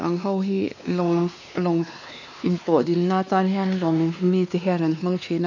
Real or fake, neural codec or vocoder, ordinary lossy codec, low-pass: fake; codec, 16 kHz, 2 kbps, X-Codec, WavLM features, trained on Multilingual LibriSpeech; none; 7.2 kHz